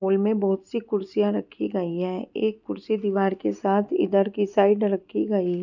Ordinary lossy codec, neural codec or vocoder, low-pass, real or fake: none; none; 7.2 kHz; real